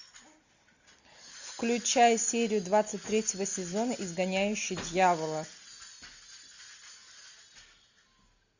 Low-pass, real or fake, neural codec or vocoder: 7.2 kHz; real; none